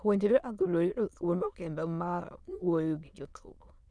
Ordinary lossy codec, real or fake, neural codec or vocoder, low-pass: none; fake; autoencoder, 22.05 kHz, a latent of 192 numbers a frame, VITS, trained on many speakers; none